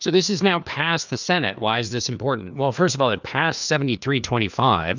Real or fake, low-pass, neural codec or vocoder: fake; 7.2 kHz; codec, 16 kHz, 2 kbps, FreqCodec, larger model